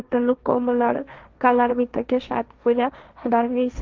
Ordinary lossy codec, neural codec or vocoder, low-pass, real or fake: Opus, 24 kbps; codec, 16 kHz, 1.1 kbps, Voila-Tokenizer; 7.2 kHz; fake